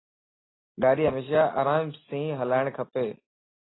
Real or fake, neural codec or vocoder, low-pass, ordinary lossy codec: real; none; 7.2 kHz; AAC, 16 kbps